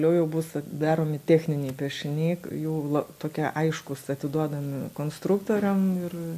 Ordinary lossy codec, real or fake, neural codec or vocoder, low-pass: AAC, 96 kbps; real; none; 14.4 kHz